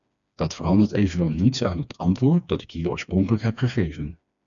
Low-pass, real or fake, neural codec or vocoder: 7.2 kHz; fake; codec, 16 kHz, 2 kbps, FreqCodec, smaller model